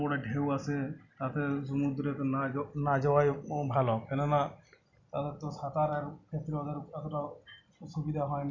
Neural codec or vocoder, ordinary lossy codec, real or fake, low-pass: none; none; real; 7.2 kHz